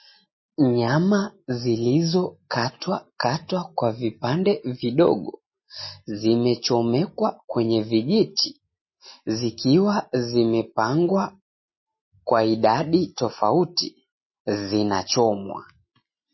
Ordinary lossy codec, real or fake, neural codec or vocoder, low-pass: MP3, 24 kbps; real; none; 7.2 kHz